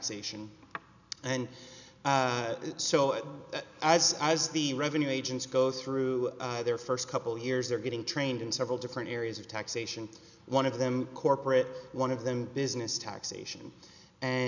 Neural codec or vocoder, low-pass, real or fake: none; 7.2 kHz; real